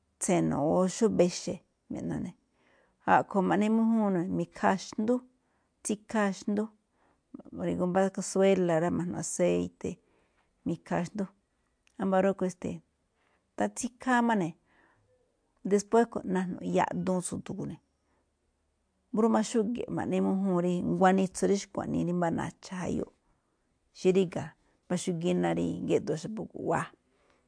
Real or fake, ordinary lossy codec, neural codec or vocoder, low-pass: real; MP3, 64 kbps; none; 9.9 kHz